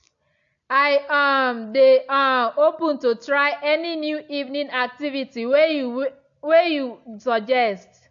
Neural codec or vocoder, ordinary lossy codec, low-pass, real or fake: none; AAC, 64 kbps; 7.2 kHz; real